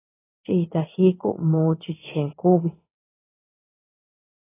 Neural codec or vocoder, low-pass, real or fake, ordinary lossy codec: codec, 16 kHz in and 24 kHz out, 1 kbps, XY-Tokenizer; 3.6 kHz; fake; AAC, 16 kbps